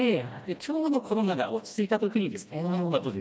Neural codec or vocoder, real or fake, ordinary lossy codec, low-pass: codec, 16 kHz, 1 kbps, FreqCodec, smaller model; fake; none; none